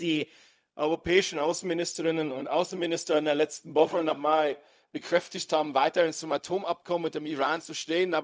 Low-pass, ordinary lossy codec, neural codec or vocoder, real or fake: none; none; codec, 16 kHz, 0.4 kbps, LongCat-Audio-Codec; fake